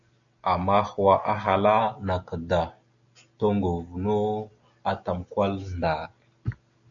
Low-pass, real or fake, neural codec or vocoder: 7.2 kHz; real; none